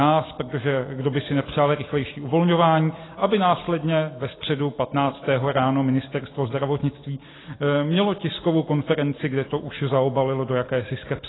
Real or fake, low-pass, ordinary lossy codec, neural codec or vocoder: real; 7.2 kHz; AAC, 16 kbps; none